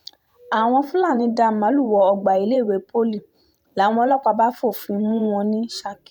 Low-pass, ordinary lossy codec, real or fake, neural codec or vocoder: 19.8 kHz; none; fake; vocoder, 44.1 kHz, 128 mel bands every 512 samples, BigVGAN v2